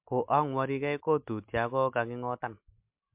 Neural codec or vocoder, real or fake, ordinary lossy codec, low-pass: none; real; MP3, 32 kbps; 3.6 kHz